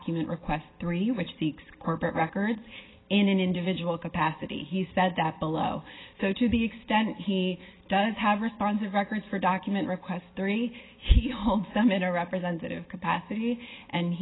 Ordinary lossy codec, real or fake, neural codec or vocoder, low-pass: AAC, 16 kbps; real; none; 7.2 kHz